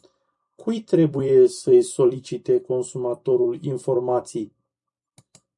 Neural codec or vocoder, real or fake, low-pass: vocoder, 44.1 kHz, 128 mel bands every 256 samples, BigVGAN v2; fake; 10.8 kHz